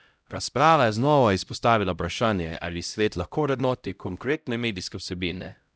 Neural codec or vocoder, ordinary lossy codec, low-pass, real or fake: codec, 16 kHz, 0.5 kbps, X-Codec, HuBERT features, trained on LibriSpeech; none; none; fake